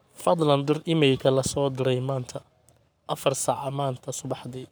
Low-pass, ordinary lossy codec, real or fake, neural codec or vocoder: none; none; fake; codec, 44.1 kHz, 7.8 kbps, Pupu-Codec